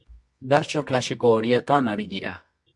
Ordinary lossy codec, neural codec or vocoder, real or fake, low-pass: MP3, 64 kbps; codec, 24 kHz, 0.9 kbps, WavTokenizer, medium music audio release; fake; 10.8 kHz